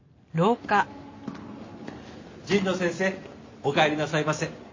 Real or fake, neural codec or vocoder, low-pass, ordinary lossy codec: real; none; 7.2 kHz; MP3, 32 kbps